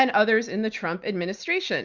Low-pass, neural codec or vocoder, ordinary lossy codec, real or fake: 7.2 kHz; none; Opus, 64 kbps; real